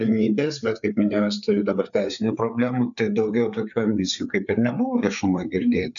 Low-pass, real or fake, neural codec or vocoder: 7.2 kHz; fake; codec, 16 kHz, 4 kbps, FreqCodec, larger model